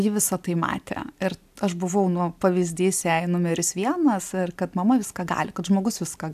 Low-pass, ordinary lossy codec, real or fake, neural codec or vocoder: 14.4 kHz; AAC, 96 kbps; real; none